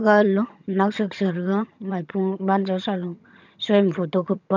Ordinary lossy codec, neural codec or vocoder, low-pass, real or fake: none; vocoder, 22.05 kHz, 80 mel bands, HiFi-GAN; 7.2 kHz; fake